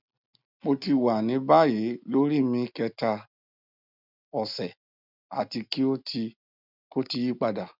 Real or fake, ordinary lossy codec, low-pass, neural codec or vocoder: real; none; 5.4 kHz; none